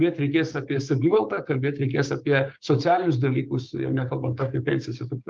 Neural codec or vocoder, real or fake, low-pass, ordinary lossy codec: codec, 16 kHz, 6 kbps, DAC; fake; 7.2 kHz; Opus, 32 kbps